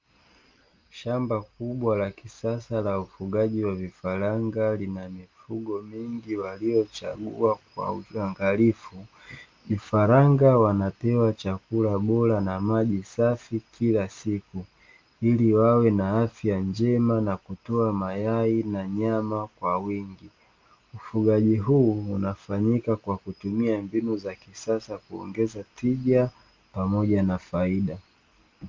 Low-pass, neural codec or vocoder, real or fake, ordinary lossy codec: 7.2 kHz; none; real; Opus, 24 kbps